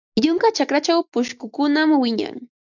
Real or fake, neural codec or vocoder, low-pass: real; none; 7.2 kHz